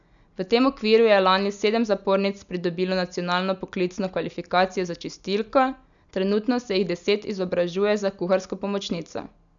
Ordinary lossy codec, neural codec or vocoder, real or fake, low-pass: none; none; real; 7.2 kHz